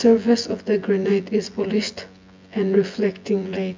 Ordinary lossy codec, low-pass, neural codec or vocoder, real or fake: MP3, 64 kbps; 7.2 kHz; vocoder, 24 kHz, 100 mel bands, Vocos; fake